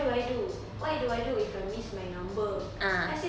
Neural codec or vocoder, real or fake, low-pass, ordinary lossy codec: none; real; none; none